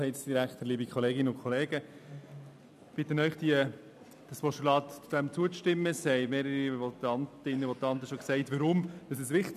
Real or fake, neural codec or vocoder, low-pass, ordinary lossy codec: real; none; 14.4 kHz; none